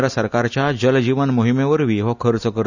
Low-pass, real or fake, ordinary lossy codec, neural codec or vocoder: 7.2 kHz; real; none; none